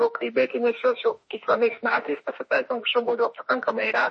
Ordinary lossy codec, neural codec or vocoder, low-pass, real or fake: MP3, 32 kbps; autoencoder, 48 kHz, 32 numbers a frame, DAC-VAE, trained on Japanese speech; 10.8 kHz; fake